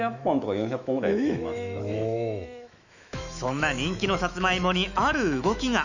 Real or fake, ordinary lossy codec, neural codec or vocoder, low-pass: fake; none; autoencoder, 48 kHz, 128 numbers a frame, DAC-VAE, trained on Japanese speech; 7.2 kHz